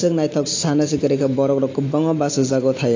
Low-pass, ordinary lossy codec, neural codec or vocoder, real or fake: 7.2 kHz; AAC, 32 kbps; none; real